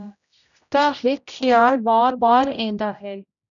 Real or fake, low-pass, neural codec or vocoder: fake; 7.2 kHz; codec, 16 kHz, 0.5 kbps, X-Codec, HuBERT features, trained on general audio